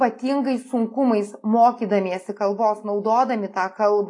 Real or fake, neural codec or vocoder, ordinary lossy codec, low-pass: real; none; AAC, 48 kbps; 10.8 kHz